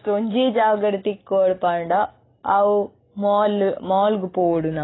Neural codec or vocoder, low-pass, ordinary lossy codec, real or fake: none; 7.2 kHz; AAC, 16 kbps; real